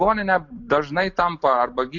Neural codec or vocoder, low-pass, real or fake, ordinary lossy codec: none; 7.2 kHz; real; MP3, 64 kbps